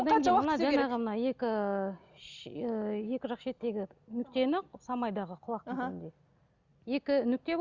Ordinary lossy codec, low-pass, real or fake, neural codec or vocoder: Opus, 64 kbps; 7.2 kHz; real; none